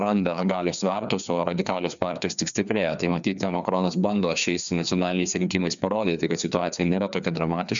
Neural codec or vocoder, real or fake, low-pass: codec, 16 kHz, 2 kbps, FreqCodec, larger model; fake; 7.2 kHz